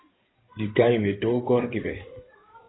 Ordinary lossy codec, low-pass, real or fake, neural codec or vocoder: AAC, 16 kbps; 7.2 kHz; fake; codec, 16 kHz in and 24 kHz out, 2.2 kbps, FireRedTTS-2 codec